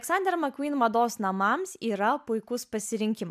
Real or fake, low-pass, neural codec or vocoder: real; 14.4 kHz; none